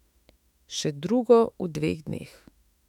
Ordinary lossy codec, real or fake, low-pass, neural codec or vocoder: none; fake; 19.8 kHz; autoencoder, 48 kHz, 32 numbers a frame, DAC-VAE, trained on Japanese speech